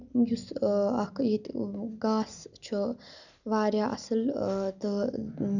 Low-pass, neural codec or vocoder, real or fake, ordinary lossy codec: 7.2 kHz; none; real; none